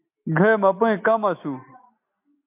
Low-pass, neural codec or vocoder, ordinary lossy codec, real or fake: 3.6 kHz; none; MP3, 32 kbps; real